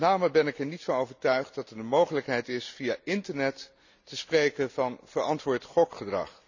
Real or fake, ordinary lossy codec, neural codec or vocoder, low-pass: real; none; none; 7.2 kHz